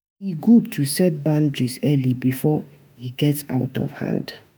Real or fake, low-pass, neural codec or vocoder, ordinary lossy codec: fake; none; autoencoder, 48 kHz, 32 numbers a frame, DAC-VAE, trained on Japanese speech; none